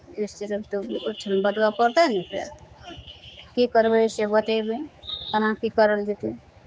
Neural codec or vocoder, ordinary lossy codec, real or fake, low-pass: codec, 16 kHz, 4 kbps, X-Codec, HuBERT features, trained on general audio; none; fake; none